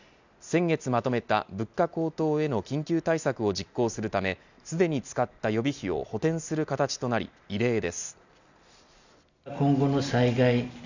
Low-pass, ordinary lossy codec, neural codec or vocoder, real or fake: 7.2 kHz; none; none; real